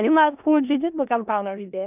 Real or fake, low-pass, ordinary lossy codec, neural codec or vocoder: fake; 3.6 kHz; none; codec, 16 kHz in and 24 kHz out, 0.4 kbps, LongCat-Audio-Codec, four codebook decoder